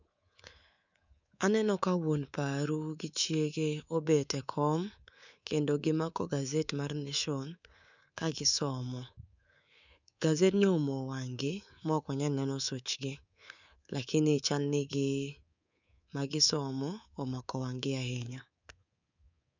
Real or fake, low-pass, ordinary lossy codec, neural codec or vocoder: fake; 7.2 kHz; none; codec, 24 kHz, 3.1 kbps, DualCodec